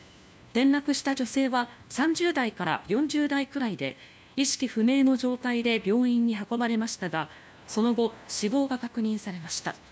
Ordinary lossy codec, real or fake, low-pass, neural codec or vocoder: none; fake; none; codec, 16 kHz, 1 kbps, FunCodec, trained on LibriTTS, 50 frames a second